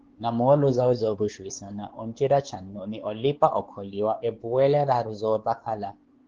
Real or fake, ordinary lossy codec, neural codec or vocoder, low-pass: fake; Opus, 16 kbps; codec, 16 kHz, 4 kbps, X-Codec, WavLM features, trained on Multilingual LibriSpeech; 7.2 kHz